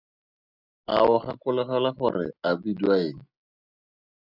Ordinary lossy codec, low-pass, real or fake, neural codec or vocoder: Opus, 64 kbps; 5.4 kHz; real; none